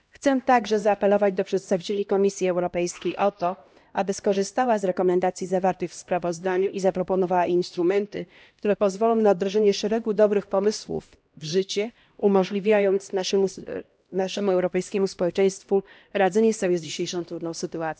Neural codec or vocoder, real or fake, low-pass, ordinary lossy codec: codec, 16 kHz, 1 kbps, X-Codec, HuBERT features, trained on LibriSpeech; fake; none; none